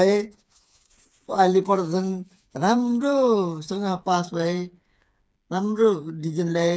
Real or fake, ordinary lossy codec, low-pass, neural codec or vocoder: fake; none; none; codec, 16 kHz, 4 kbps, FreqCodec, smaller model